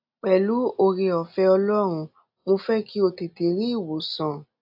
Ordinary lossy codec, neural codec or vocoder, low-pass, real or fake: AAC, 48 kbps; none; 5.4 kHz; real